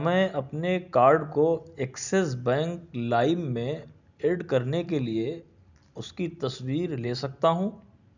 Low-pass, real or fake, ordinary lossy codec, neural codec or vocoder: 7.2 kHz; real; none; none